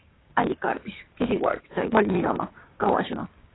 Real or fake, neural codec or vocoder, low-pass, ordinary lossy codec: fake; codec, 16 kHz, 6 kbps, DAC; 7.2 kHz; AAC, 16 kbps